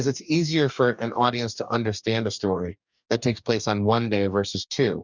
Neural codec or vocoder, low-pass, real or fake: codec, 44.1 kHz, 2.6 kbps, DAC; 7.2 kHz; fake